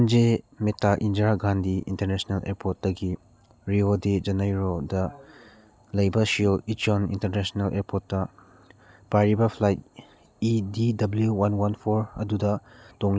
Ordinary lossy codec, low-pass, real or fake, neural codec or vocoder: none; none; real; none